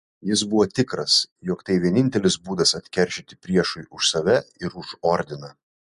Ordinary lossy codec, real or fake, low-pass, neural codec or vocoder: MP3, 64 kbps; real; 10.8 kHz; none